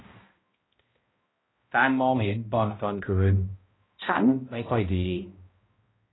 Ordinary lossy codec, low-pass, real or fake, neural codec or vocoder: AAC, 16 kbps; 7.2 kHz; fake; codec, 16 kHz, 0.5 kbps, X-Codec, HuBERT features, trained on balanced general audio